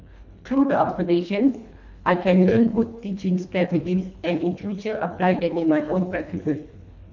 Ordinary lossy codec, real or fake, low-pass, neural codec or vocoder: none; fake; 7.2 kHz; codec, 24 kHz, 1.5 kbps, HILCodec